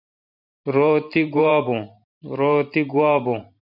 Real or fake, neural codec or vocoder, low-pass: fake; vocoder, 44.1 kHz, 128 mel bands every 512 samples, BigVGAN v2; 5.4 kHz